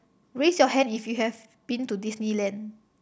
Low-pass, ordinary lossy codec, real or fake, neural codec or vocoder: none; none; real; none